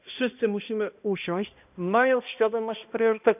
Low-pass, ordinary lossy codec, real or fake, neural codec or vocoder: 3.6 kHz; none; fake; codec, 16 kHz, 1 kbps, X-Codec, HuBERT features, trained on balanced general audio